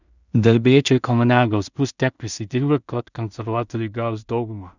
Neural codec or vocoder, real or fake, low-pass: codec, 16 kHz in and 24 kHz out, 0.4 kbps, LongCat-Audio-Codec, two codebook decoder; fake; 7.2 kHz